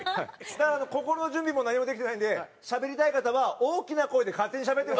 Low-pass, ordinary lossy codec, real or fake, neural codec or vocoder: none; none; real; none